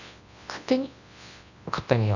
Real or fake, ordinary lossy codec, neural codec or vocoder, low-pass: fake; none; codec, 24 kHz, 0.9 kbps, WavTokenizer, large speech release; 7.2 kHz